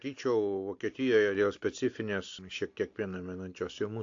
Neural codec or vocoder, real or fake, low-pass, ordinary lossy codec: none; real; 7.2 kHz; AAC, 48 kbps